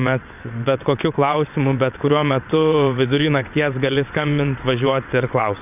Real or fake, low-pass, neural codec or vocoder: fake; 3.6 kHz; vocoder, 22.05 kHz, 80 mel bands, WaveNeXt